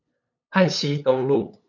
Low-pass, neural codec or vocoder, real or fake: 7.2 kHz; codec, 16 kHz, 8 kbps, FunCodec, trained on LibriTTS, 25 frames a second; fake